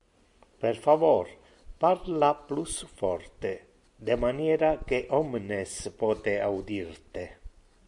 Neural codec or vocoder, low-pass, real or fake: none; 10.8 kHz; real